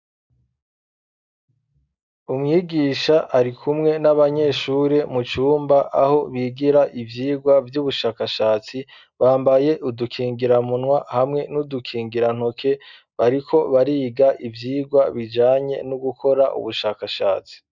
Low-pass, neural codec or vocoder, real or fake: 7.2 kHz; none; real